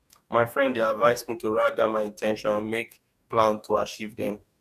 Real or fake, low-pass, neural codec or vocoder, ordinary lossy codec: fake; 14.4 kHz; codec, 44.1 kHz, 2.6 kbps, DAC; none